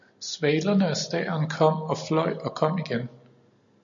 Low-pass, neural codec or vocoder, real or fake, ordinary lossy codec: 7.2 kHz; none; real; MP3, 64 kbps